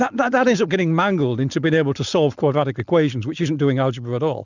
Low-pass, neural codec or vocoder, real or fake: 7.2 kHz; none; real